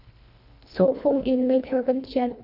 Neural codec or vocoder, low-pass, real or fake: codec, 24 kHz, 1.5 kbps, HILCodec; 5.4 kHz; fake